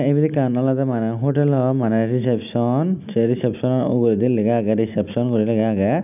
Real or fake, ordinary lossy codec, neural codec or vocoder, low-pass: real; none; none; 3.6 kHz